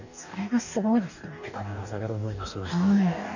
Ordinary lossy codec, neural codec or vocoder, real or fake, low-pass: none; codec, 44.1 kHz, 2.6 kbps, DAC; fake; 7.2 kHz